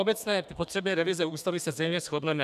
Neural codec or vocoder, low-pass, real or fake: codec, 32 kHz, 1.9 kbps, SNAC; 14.4 kHz; fake